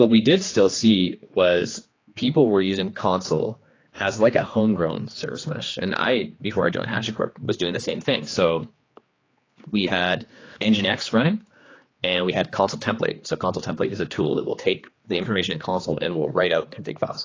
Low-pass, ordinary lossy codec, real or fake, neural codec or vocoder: 7.2 kHz; AAC, 32 kbps; fake; codec, 16 kHz, 2 kbps, X-Codec, HuBERT features, trained on general audio